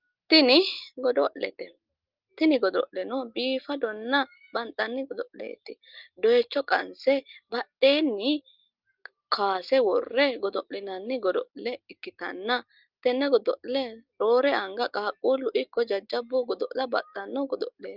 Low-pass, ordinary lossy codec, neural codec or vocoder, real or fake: 5.4 kHz; Opus, 32 kbps; none; real